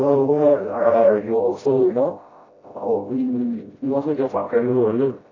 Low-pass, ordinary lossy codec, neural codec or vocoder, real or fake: 7.2 kHz; AAC, 32 kbps; codec, 16 kHz, 0.5 kbps, FreqCodec, smaller model; fake